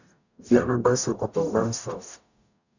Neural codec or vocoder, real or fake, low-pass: codec, 44.1 kHz, 0.9 kbps, DAC; fake; 7.2 kHz